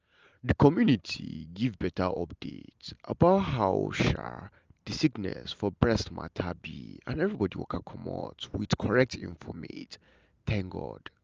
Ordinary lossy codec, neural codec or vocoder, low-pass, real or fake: Opus, 24 kbps; none; 7.2 kHz; real